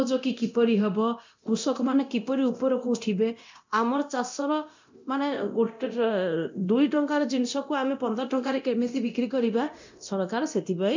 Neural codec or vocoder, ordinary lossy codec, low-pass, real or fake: codec, 24 kHz, 0.9 kbps, DualCodec; MP3, 64 kbps; 7.2 kHz; fake